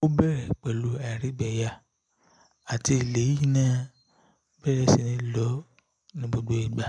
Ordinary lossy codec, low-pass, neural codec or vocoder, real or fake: MP3, 96 kbps; 9.9 kHz; none; real